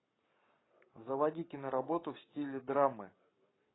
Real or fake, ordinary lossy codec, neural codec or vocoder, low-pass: fake; AAC, 16 kbps; codec, 44.1 kHz, 7.8 kbps, Pupu-Codec; 7.2 kHz